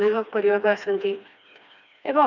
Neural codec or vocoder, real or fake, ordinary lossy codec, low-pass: codec, 16 kHz, 2 kbps, FreqCodec, smaller model; fake; none; 7.2 kHz